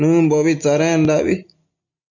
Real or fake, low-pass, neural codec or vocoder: real; 7.2 kHz; none